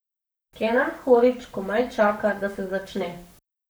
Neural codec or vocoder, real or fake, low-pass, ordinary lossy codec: codec, 44.1 kHz, 7.8 kbps, Pupu-Codec; fake; none; none